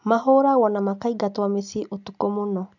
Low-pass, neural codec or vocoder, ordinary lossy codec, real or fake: 7.2 kHz; none; none; real